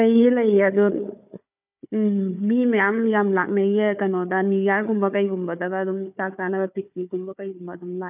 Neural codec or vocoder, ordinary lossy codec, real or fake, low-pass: codec, 16 kHz, 4 kbps, FunCodec, trained on Chinese and English, 50 frames a second; none; fake; 3.6 kHz